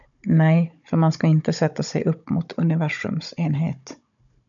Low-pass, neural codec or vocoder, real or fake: 7.2 kHz; codec, 16 kHz, 16 kbps, FunCodec, trained on Chinese and English, 50 frames a second; fake